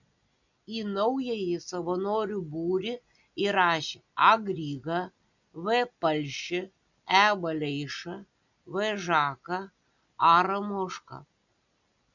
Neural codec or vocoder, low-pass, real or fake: none; 7.2 kHz; real